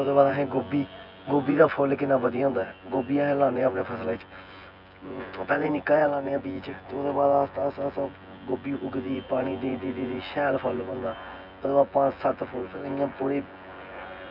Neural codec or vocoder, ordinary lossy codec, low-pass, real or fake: vocoder, 24 kHz, 100 mel bands, Vocos; none; 5.4 kHz; fake